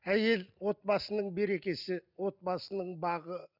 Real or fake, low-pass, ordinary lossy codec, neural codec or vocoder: real; 5.4 kHz; none; none